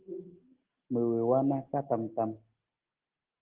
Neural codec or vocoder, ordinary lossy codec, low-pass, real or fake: none; Opus, 16 kbps; 3.6 kHz; real